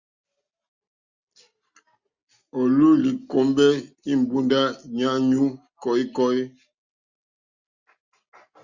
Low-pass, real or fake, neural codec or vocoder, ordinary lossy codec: 7.2 kHz; real; none; Opus, 64 kbps